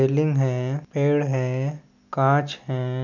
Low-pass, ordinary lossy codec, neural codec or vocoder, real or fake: 7.2 kHz; none; none; real